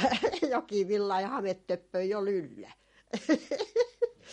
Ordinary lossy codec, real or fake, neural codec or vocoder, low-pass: MP3, 48 kbps; real; none; 19.8 kHz